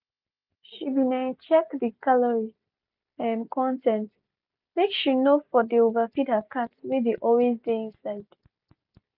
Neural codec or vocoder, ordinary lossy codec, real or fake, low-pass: none; none; real; 5.4 kHz